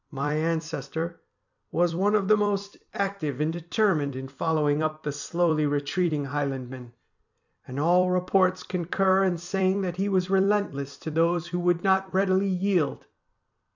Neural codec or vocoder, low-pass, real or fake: vocoder, 44.1 kHz, 128 mel bands every 256 samples, BigVGAN v2; 7.2 kHz; fake